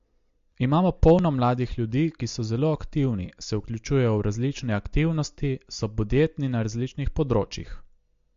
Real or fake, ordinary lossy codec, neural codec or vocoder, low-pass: real; MP3, 48 kbps; none; 7.2 kHz